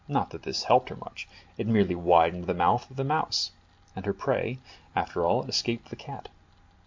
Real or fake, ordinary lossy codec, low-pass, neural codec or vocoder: real; MP3, 48 kbps; 7.2 kHz; none